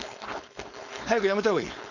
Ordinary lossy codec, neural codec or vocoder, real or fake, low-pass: none; codec, 16 kHz, 4.8 kbps, FACodec; fake; 7.2 kHz